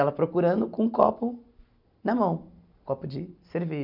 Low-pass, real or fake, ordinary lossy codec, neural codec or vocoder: 5.4 kHz; real; none; none